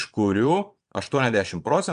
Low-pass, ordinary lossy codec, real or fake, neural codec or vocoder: 9.9 kHz; MP3, 64 kbps; fake; vocoder, 22.05 kHz, 80 mel bands, Vocos